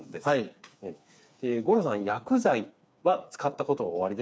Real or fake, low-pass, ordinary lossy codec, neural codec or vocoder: fake; none; none; codec, 16 kHz, 4 kbps, FreqCodec, smaller model